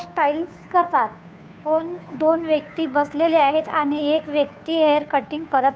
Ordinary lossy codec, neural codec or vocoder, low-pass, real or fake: none; codec, 16 kHz, 2 kbps, FunCodec, trained on Chinese and English, 25 frames a second; none; fake